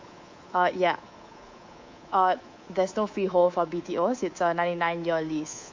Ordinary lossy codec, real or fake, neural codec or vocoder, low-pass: MP3, 48 kbps; fake; codec, 24 kHz, 3.1 kbps, DualCodec; 7.2 kHz